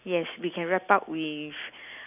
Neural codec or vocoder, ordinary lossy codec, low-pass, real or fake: none; none; 3.6 kHz; real